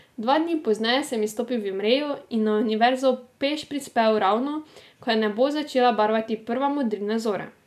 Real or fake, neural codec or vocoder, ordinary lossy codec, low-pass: real; none; none; 14.4 kHz